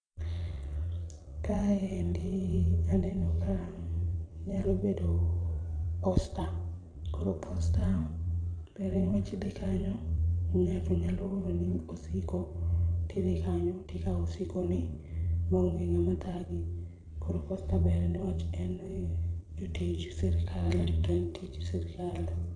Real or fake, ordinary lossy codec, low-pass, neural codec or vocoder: fake; AAC, 64 kbps; 9.9 kHz; vocoder, 22.05 kHz, 80 mel bands, Vocos